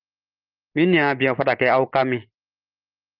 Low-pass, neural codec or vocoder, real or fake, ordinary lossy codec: 5.4 kHz; none; real; Opus, 24 kbps